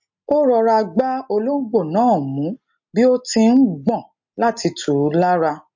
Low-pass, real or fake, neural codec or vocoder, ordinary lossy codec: 7.2 kHz; real; none; MP3, 48 kbps